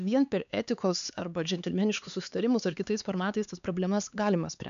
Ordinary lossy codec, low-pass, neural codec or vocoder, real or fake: AAC, 96 kbps; 7.2 kHz; codec, 16 kHz, 4 kbps, X-Codec, HuBERT features, trained on LibriSpeech; fake